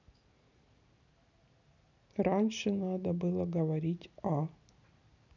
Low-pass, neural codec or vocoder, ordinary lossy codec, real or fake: 7.2 kHz; none; none; real